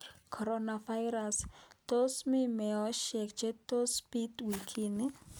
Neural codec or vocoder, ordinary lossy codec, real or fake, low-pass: none; none; real; none